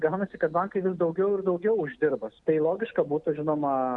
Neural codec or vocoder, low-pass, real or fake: none; 10.8 kHz; real